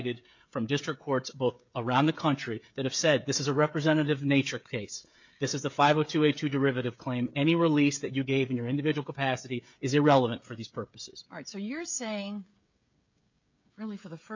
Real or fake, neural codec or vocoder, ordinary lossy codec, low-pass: fake; codec, 16 kHz, 16 kbps, FreqCodec, smaller model; MP3, 64 kbps; 7.2 kHz